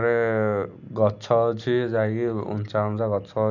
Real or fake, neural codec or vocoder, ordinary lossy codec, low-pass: real; none; none; 7.2 kHz